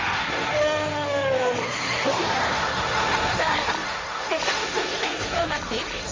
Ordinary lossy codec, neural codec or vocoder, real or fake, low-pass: Opus, 32 kbps; codec, 16 kHz, 1.1 kbps, Voila-Tokenizer; fake; 7.2 kHz